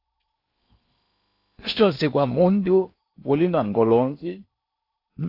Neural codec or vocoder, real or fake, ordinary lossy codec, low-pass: codec, 16 kHz in and 24 kHz out, 0.8 kbps, FocalCodec, streaming, 65536 codes; fake; AAC, 32 kbps; 5.4 kHz